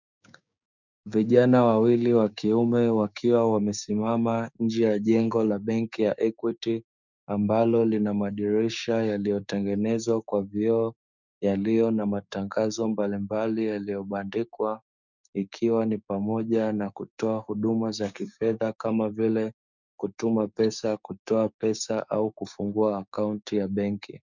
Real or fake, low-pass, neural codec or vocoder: fake; 7.2 kHz; codec, 16 kHz, 6 kbps, DAC